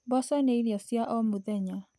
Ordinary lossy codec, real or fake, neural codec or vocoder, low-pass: none; real; none; none